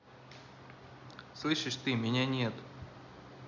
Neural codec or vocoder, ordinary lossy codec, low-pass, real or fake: none; none; 7.2 kHz; real